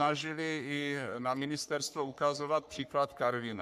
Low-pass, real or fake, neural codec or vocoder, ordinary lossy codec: 14.4 kHz; fake; codec, 44.1 kHz, 3.4 kbps, Pupu-Codec; MP3, 96 kbps